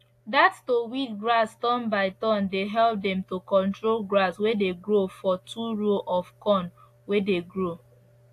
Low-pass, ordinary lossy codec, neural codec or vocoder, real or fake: 14.4 kHz; AAC, 64 kbps; none; real